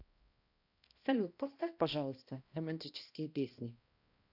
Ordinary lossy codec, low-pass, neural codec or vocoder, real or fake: AAC, 48 kbps; 5.4 kHz; codec, 16 kHz, 1 kbps, X-Codec, HuBERT features, trained on balanced general audio; fake